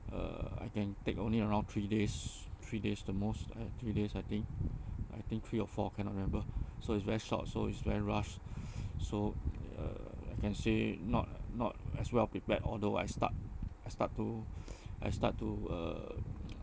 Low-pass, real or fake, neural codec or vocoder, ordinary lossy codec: none; real; none; none